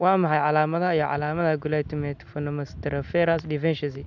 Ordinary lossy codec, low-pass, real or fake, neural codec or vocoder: none; 7.2 kHz; real; none